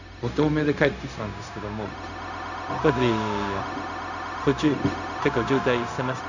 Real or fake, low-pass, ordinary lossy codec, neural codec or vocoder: fake; 7.2 kHz; none; codec, 16 kHz, 0.4 kbps, LongCat-Audio-Codec